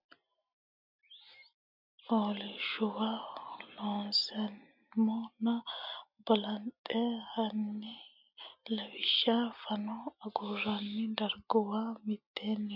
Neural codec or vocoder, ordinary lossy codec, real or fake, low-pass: none; MP3, 48 kbps; real; 5.4 kHz